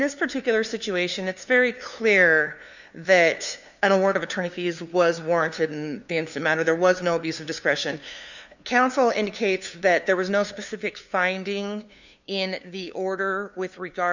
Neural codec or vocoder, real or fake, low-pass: codec, 16 kHz, 2 kbps, FunCodec, trained on LibriTTS, 25 frames a second; fake; 7.2 kHz